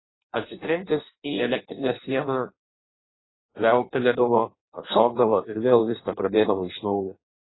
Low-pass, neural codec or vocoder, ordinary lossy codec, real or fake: 7.2 kHz; codec, 16 kHz in and 24 kHz out, 0.6 kbps, FireRedTTS-2 codec; AAC, 16 kbps; fake